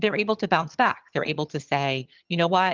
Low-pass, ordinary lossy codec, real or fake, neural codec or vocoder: 7.2 kHz; Opus, 32 kbps; fake; vocoder, 22.05 kHz, 80 mel bands, HiFi-GAN